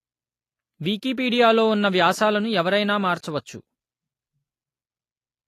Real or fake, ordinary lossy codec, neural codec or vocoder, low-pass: real; AAC, 48 kbps; none; 14.4 kHz